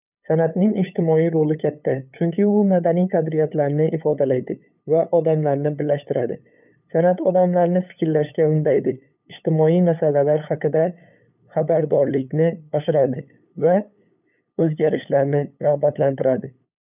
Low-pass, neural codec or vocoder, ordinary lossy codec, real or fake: 3.6 kHz; codec, 16 kHz, 8 kbps, FunCodec, trained on LibriTTS, 25 frames a second; none; fake